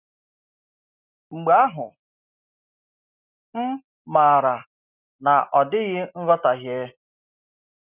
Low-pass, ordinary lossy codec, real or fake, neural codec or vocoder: 3.6 kHz; none; real; none